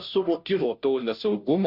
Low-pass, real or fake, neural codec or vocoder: 5.4 kHz; fake; codec, 16 kHz, 0.5 kbps, FunCodec, trained on Chinese and English, 25 frames a second